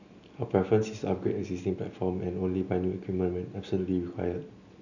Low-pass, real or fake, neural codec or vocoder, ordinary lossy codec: 7.2 kHz; real; none; none